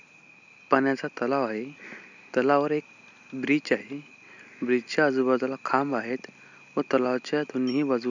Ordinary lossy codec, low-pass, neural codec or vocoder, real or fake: none; 7.2 kHz; none; real